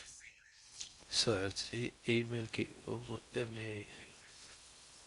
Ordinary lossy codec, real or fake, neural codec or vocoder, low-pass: Opus, 64 kbps; fake; codec, 16 kHz in and 24 kHz out, 0.6 kbps, FocalCodec, streaming, 4096 codes; 10.8 kHz